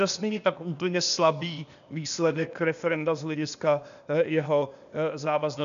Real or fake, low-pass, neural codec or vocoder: fake; 7.2 kHz; codec, 16 kHz, 0.8 kbps, ZipCodec